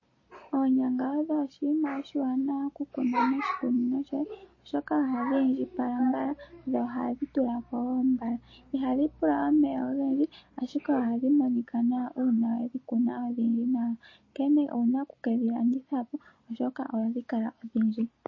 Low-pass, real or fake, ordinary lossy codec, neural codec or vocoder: 7.2 kHz; real; MP3, 32 kbps; none